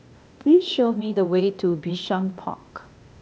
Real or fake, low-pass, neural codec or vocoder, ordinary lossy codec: fake; none; codec, 16 kHz, 0.8 kbps, ZipCodec; none